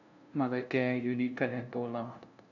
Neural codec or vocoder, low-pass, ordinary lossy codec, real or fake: codec, 16 kHz, 0.5 kbps, FunCodec, trained on LibriTTS, 25 frames a second; 7.2 kHz; none; fake